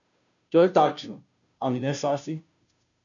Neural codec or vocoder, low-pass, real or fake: codec, 16 kHz, 0.5 kbps, FunCodec, trained on Chinese and English, 25 frames a second; 7.2 kHz; fake